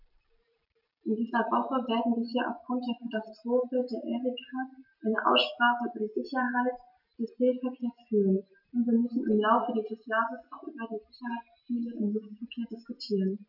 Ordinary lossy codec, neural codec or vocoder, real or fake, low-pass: none; none; real; 5.4 kHz